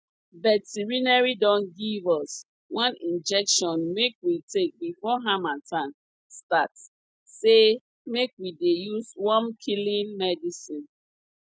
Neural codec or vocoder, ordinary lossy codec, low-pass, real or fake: none; none; none; real